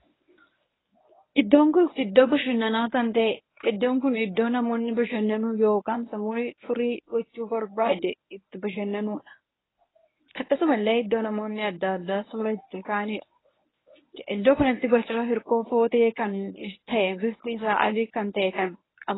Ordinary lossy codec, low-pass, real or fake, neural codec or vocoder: AAC, 16 kbps; 7.2 kHz; fake; codec, 24 kHz, 0.9 kbps, WavTokenizer, medium speech release version 1